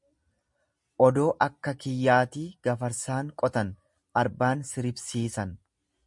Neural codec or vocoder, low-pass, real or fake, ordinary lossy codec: none; 10.8 kHz; real; MP3, 64 kbps